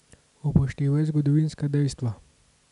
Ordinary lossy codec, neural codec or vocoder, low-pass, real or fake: none; none; 10.8 kHz; real